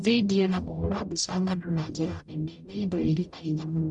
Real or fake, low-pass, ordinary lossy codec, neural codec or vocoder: fake; 10.8 kHz; none; codec, 44.1 kHz, 0.9 kbps, DAC